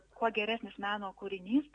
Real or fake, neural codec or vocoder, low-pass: real; none; 9.9 kHz